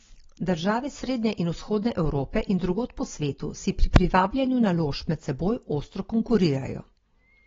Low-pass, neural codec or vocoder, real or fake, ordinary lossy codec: 7.2 kHz; none; real; AAC, 24 kbps